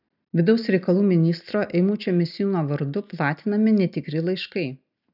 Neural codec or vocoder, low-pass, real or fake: none; 5.4 kHz; real